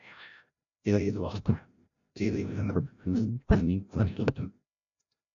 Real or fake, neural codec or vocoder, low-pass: fake; codec, 16 kHz, 0.5 kbps, FreqCodec, larger model; 7.2 kHz